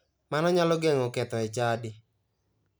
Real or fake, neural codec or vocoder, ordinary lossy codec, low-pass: real; none; none; none